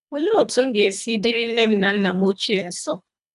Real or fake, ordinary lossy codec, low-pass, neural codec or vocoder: fake; none; 10.8 kHz; codec, 24 kHz, 1.5 kbps, HILCodec